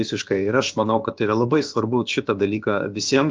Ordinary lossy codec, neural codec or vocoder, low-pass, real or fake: Opus, 32 kbps; codec, 16 kHz, about 1 kbps, DyCAST, with the encoder's durations; 7.2 kHz; fake